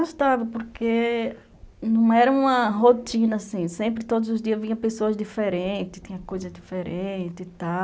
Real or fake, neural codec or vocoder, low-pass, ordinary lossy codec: real; none; none; none